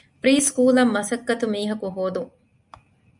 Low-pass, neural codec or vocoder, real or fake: 10.8 kHz; none; real